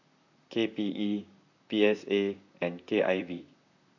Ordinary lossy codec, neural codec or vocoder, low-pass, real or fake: none; vocoder, 44.1 kHz, 128 mel bands, Pupu-Vocoder; 7.2 kHz; fake